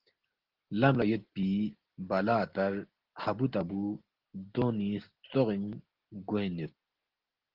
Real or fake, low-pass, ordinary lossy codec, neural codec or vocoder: real; 5.4 kHz; Opus, 16 kbps; none